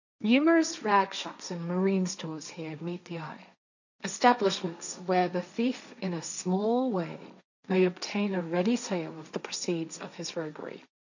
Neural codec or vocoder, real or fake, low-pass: codec, 16 kHz, 1.1 kbps, Voila-Tokenizer; fake; 7.2 kHz